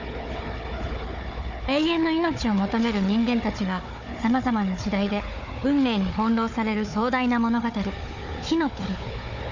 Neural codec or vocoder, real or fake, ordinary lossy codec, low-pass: codec, 16 kHz, 4 kbps, FunCodec, trained on Chinese and English, 50 frames a second; fake; none; 7.2 kHz